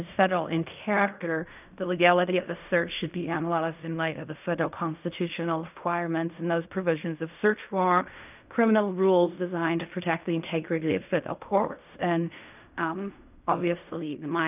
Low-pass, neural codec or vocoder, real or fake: 3.6 kHz; codec, 16 kHz in and 24 kHz out, 0.4 kbps, LongCat-Audio-Codec, fine tuned four codebook decoder; fake